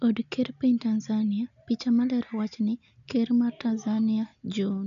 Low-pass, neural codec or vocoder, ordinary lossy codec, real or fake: 7.2 kHz; none; none; real